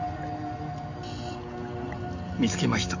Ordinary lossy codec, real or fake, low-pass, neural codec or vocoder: none; real; 7.2 kHz; none